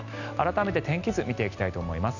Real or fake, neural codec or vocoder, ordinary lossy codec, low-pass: real; none; none; 7.2 kHz